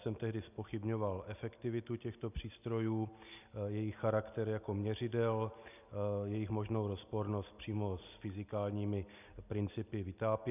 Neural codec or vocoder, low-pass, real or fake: none; 3.6 kHz; real